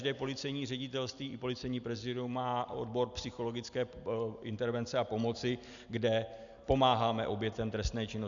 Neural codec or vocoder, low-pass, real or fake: none; 7.2 kHz; real